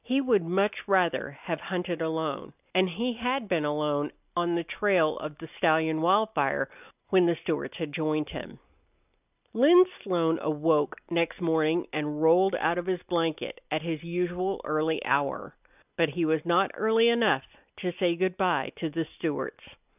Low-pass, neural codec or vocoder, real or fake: 3.6 kHz; none; real